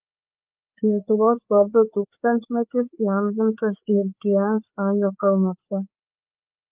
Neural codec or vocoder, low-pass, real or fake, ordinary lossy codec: codec, 16 kHz, 4 kbps, FreqCodec, larger model; 3.6 kHz; fake; Opus, 32 kbps